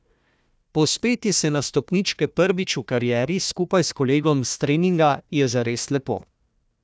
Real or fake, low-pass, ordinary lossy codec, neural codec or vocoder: fake; none; none; codec, 16 kHz, 1 kbps, FunCodec, trained on Chinese and English, 50 frames a second